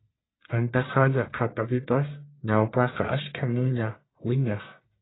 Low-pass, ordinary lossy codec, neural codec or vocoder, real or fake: 7.2 kHz; AAC, 16 kbps; codec, 44.1 kHz, 1.7 kbps, Pupu-Codec; fake